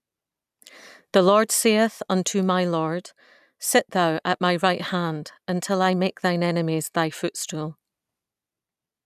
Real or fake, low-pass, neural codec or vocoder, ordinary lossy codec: real; 14.4 kHz; none; none